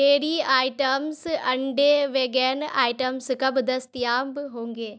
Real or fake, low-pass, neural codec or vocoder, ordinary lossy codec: real; none; none; none